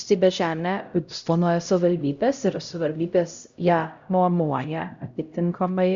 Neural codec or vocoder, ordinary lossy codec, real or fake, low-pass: codec, 16 kHz, 0.5 kbps, X-Codec, HuBERT features, trained on LibriSpeech; Opus, 64 kbps; fake; 7.2 kHz